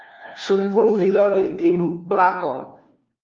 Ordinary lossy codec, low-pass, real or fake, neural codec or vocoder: Opus, 32 kbps; 7.2 kHz; fake; codec, 16 kHz, 1 kbps, FunCodec, trained on LibriTTS, 50 frames a second